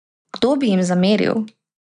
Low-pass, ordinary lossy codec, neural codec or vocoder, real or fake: 9.9 kHz; none; none; real